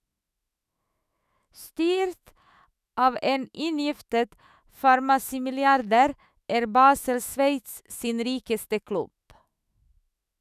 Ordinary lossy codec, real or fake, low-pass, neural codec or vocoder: none; fake; 14.4 kHz; autoencoder, 48 kHz, 128 numbers a frame, DAC-VAE, trained on Japanese speech